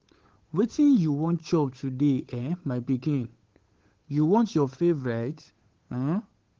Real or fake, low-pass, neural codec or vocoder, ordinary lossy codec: fake; 7.2 kHz; codec, 16 kHz, 8 kbps, FunCodec, trained on Chinese and English, 25 frames a second; Opus, 16 kbps